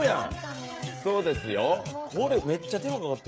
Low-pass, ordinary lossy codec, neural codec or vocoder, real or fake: none; none; codec, 16 kHz, 16 kbps, FreqCodec, smaller model; fake